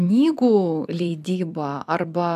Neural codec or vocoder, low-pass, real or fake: vocoder, 44.1 kHz, 128 mel bands every 256 samples, BigVGAN v2; 14.4 kHz; fake